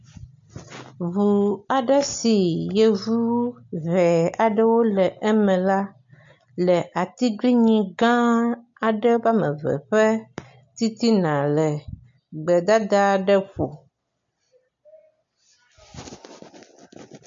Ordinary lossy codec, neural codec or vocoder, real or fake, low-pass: MP3, 48 kbps; none; real; 7.2 kHz